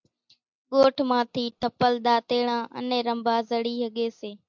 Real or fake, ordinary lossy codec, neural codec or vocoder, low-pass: real; MP3, 64 kbps; none; 7.2 kHz